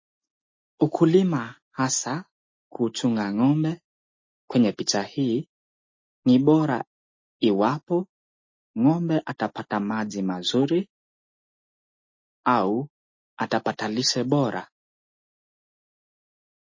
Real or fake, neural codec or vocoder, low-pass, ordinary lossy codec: real; none; 7.2 kHz; MP3, 32 kbps